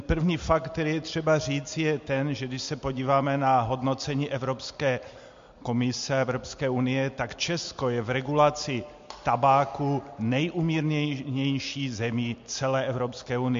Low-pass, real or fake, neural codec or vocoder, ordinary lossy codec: 7.2 kHz; real; none; MP3, 48 kbps